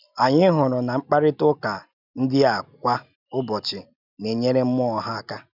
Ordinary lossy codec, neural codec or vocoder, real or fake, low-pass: none; none; real; 5.4 kHz